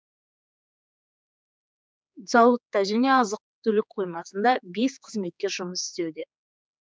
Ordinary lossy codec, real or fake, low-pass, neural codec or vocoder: none; fake; none; codec, 16 kHz, 4 kbps, X-Codec, HuBERT features, trained on general audio